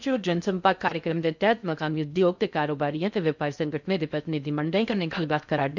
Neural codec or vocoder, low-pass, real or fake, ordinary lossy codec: codec, 16 kHz in and 24 kHz out, 0.6 kbps, FocalCodec, streaming, 2048 codes; 7.2 kHz; fake; none